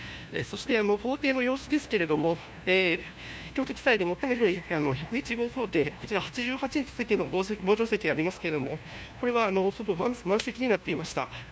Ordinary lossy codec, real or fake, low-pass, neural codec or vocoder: none; fake; none; codec, 16 kHz, 1 kbps, FunCodec, trained on LibriTTS, 50 frames a second